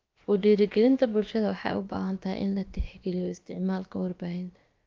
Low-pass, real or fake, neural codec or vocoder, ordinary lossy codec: 7.2 kHz; fake; codec, 16 kHz, about 1 kbps, DyCAST, with the encoder's durations; Opus, 24 kbps